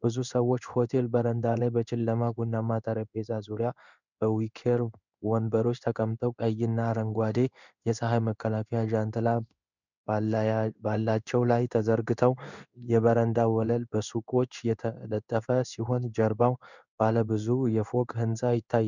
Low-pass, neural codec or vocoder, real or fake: 7.2 kHz; codec, 16 kHz in and 24 kHz out, 1 kbps, XY-Tokenizer; fake